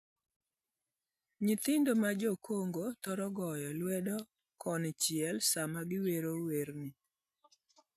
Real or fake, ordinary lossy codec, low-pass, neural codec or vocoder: real; none; 14.4 kHz; none